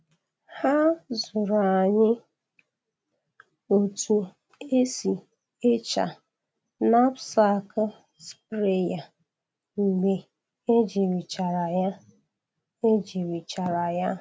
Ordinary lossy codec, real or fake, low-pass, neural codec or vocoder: none; real; none; none